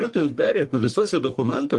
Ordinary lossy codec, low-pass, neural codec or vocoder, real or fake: Opus, 24 kbps; 10.8 kHz; codec, 44.1 kHz, 1.7 kbps, Pupu-Codec; fake